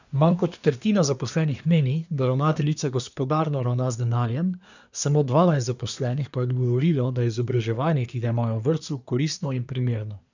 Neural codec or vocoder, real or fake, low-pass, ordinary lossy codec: codec, 24 kHz, 1 kbps, SNAC; fake; 7.2 kHz; none